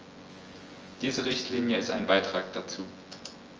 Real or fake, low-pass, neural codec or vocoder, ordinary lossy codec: fake; 7.2 kHz; vocoder, 24 kHz, 100 mel bands, Vocos; Opus, 24 kbps